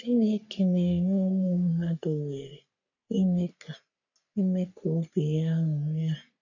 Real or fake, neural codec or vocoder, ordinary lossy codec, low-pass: fake; codec, 44.1 kHz, 3.4 kbps, Pupu-Codec; none; 7.2 kHz